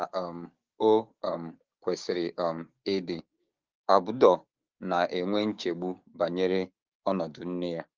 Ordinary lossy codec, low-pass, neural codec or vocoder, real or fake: Opus, 32 kbps; 7.2 kHz; codec, 16 kHz, 6 kbps, DAC; fake